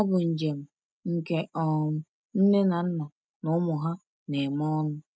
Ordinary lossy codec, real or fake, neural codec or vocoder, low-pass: none; real; none; none